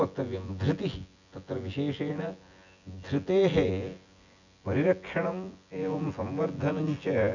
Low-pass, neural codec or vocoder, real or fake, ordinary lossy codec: 7.2 kHz; vocoder, 24 kHz, 100 mel bands, Vocos; fake; none